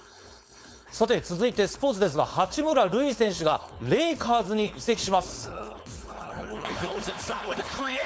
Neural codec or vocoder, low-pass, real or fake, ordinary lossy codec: codec, 16 kHz, 4.8 kbps, FACodec; none; fake; none